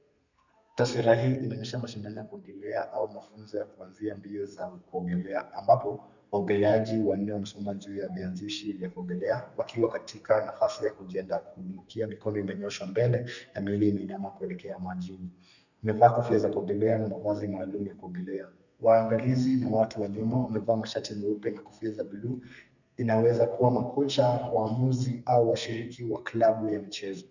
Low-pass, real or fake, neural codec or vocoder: 7.2 kHz; fake; codec, 32 kHz, 1.9 kbps, SNAC